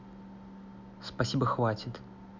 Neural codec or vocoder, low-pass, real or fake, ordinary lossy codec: none; 7.2 kHz; real; none